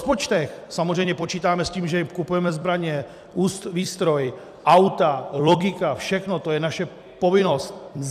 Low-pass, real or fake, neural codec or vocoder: 14.4 kHz; fake; vocoder, 44.1 kHz, 128 mel bands every 256 samples, BigVGAN v2